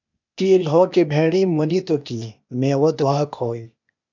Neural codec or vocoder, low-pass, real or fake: codec, 16 kHz, 0.8 kbps, ZipCodec; 7.2 kHz; fake